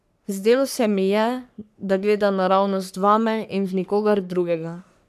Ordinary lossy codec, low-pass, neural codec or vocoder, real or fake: none; 14.4 kHz; codec, 44.1 kHz, 3.4 kbps, Pupu-Codec; fake